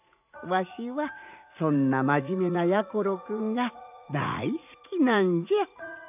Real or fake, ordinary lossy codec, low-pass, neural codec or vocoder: fake; none; 3.6 kHz; vocoder, 44.1 kHz, 80 mel bands, Vocos